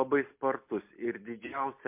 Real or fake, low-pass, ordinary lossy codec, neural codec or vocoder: real; 3.6 kHz; MP3, 32 kbps; none